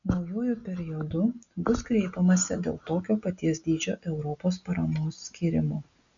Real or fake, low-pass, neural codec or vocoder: real; 7.2 kHz; none